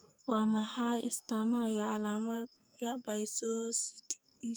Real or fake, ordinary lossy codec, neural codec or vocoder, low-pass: fake; none; codec, 44.1 kHz, 2.6 kbps, SNAC; none